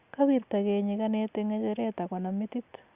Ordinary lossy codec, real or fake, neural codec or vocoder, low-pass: Opus, 64 kbps; real; none; 3.6 kHz